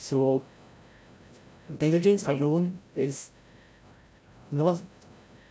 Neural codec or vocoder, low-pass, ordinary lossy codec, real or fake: codec, 16 kHz, 0.5 kbps, FreqCodec, larger model; none; none; fake